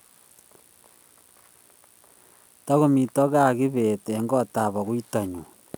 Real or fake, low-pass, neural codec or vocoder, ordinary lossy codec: real; none; none; none